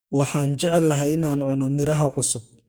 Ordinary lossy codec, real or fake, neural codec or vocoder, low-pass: none; fake; codec, 44.1 kHz, 2.6 kbps, DAC; none